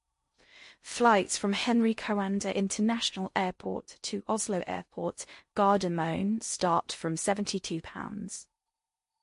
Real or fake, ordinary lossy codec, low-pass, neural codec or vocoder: fake; MP3, 48 kbps; 10.8 kHz; codec, 16 kHz in and 24 kHz out, 0.6 kbps, FocalCodec, streaming, 2048 codes